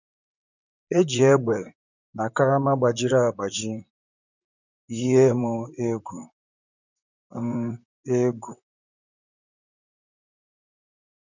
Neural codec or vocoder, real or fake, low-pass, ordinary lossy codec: vocoder, 44.1 kHz, 128 mel bands, Pupu-Vocoder; fake; 7.2 kHz; none